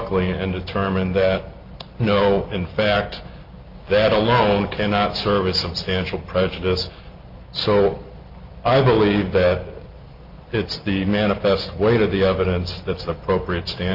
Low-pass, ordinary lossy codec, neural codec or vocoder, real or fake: 5.4 kHz; Opus, 32 kbps; none; real